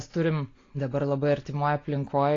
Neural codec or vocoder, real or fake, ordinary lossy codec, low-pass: none; real; AAC, 32 kbps; 7.2 kHz